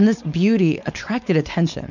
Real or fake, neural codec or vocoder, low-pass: fake; codec, 16 kHz, 4.8 kbps, FACodec; 7.2 kHz